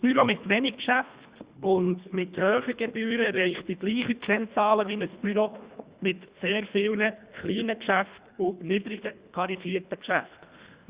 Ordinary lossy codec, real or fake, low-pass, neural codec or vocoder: Opus, 64 kbps; fake; 3.6 kHz; codec, 24 kHz, 1.5 kbps, HILCodec